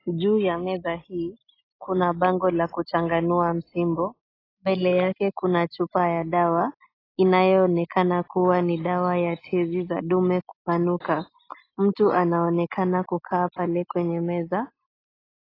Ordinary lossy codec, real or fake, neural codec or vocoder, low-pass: AAC, 24 kbps; real; none; 5.4 kHz